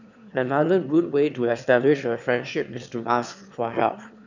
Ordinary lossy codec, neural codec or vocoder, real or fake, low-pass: none; autoencoder, 22.05 kHz, a latent of 192 numbers a frame, VITS, trained on one speaker; fake; 7.2 kHz